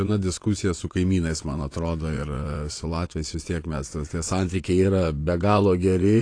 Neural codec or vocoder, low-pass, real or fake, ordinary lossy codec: vocoder, 22.05 kHz, 80 mel bands, WaveNeXt; 9.9 kHz; fake; AAC, 48 kbps